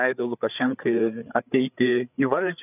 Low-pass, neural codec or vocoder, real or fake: 3.6 kHz; codec, 16 kHz, 8 kbps, FreqCodec, larger model; fake